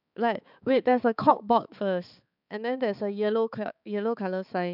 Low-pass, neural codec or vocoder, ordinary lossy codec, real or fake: 5.4 kHz; codec, 16 kHz, 4 kbps, X-Codec, HuBERT features, trained on balanced general audio; none; fake